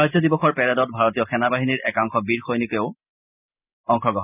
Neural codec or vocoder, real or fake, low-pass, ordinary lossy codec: none; real; 3.6 kHz; none